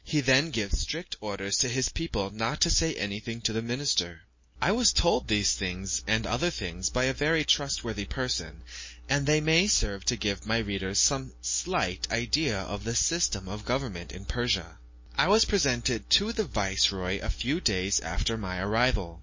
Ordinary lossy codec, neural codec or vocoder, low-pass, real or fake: MP3, 32 kbps; none; 7.2 kHz; real